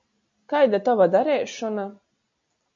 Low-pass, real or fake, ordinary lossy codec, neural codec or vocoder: 7.2 kHz; real; MP3, 48 kbps; none